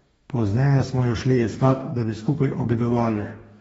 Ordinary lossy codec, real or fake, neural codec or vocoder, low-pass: AAC, 24 kbps; fake; codec, 44.1 kHz, 2.6 kbps, DAC; 19.8 kHz